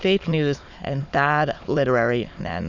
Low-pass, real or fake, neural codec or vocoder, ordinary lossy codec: 7.2 kHz; fake; autoencoder, 22.05 kHz, a latent of 192 numbers a frame, VITS, trained on many speakers; Opus, 64 kbps